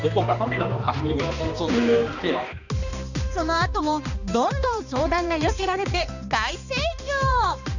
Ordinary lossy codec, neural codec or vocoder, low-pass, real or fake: none; codec, 16 kHz, 2 kbps, X-Codec, HuBERT features, trained on balanced general audio; 7.2 kHz; fake